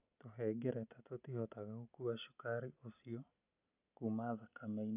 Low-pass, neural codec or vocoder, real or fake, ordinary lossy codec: 3.6 kHz; none; real; none